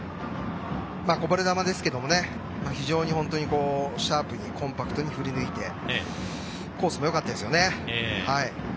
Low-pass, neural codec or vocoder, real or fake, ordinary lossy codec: none; none; real; none